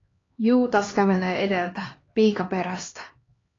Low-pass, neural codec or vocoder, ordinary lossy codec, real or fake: 7.2 kHz; codec, 16 kHz, 2 kbps, X-Codec, HuBERT features, trained on LibriSpeech; AAC, 32 kbps; fake